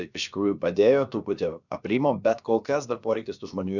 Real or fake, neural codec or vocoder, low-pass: fake; codec, 16 kHz, about 1 kbps, DyCAST, with the encoder's durations; 7.2 kHz